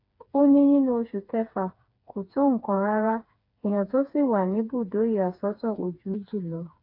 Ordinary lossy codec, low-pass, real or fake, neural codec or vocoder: AAC, 32 kbps; 5.4 kHz; fake; codec, 16 kHz, 4 kbps, FreqCodec, smaller model